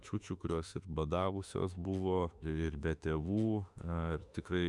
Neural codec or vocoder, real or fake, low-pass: autoencoder, 48 kHz, 32 numbers a frame, DAC-VAE, trained on Japanese speech; fake; 10.8 kHz